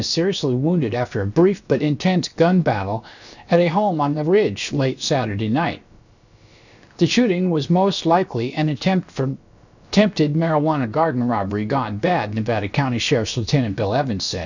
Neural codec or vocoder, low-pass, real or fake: codec, 16 kHz, about 1 kbps, DyCAST, with the encoder's durations; 7.2 kHz; fake